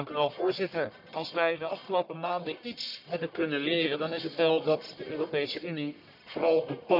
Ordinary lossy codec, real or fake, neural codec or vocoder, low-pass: none; fake; codec, 44.1 kHz, 1.7 kbps, Pupu-Codec; 5.4 kHz